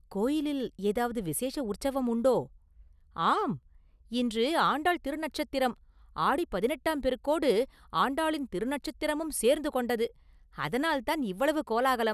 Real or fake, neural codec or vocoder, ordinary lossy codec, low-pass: real; none; none; 14.4 kHz